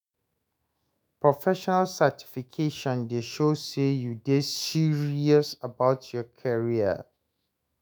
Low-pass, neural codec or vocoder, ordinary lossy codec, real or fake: none; autoencoder, 48 kHz, 128 numbers a frame, DAC-VAE, trained on Japanese speech; none; fake